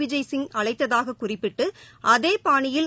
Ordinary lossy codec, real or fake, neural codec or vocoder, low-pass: none; real; none; none